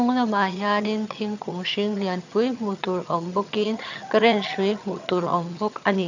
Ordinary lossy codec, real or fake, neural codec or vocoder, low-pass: none; fake; vocoder, 22.05 kHz, 80 mel bands, HiFi-GAN; 7.2 kHz